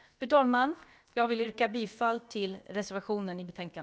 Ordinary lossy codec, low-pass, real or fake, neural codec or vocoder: none; none; fake; codec, 16 kHz, about 1 kbps, DyCAST, with the encoder's durations